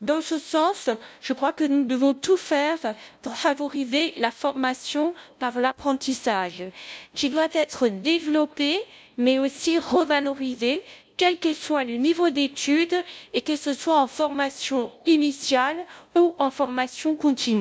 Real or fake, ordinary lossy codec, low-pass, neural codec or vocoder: fake; none; none; codec, 16 kHz, 0.5 kbps, FunCodec, trained on LibriTTS, 25 frames a second